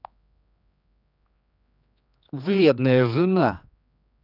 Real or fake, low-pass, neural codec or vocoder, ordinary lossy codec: fake; 5.4 kHz; codec, 16 kHz, 2 kbps, X-Codec, HuBERT features, trained on general audio; none